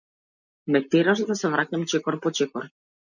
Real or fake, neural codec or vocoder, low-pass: real; none; 7.2 kHz